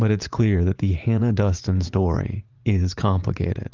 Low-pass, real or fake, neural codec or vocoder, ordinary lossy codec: 7.2 kHz; fake; vocoder, 44.1 kHz, 80 mel bands, Vocos; Opus, 32 kbps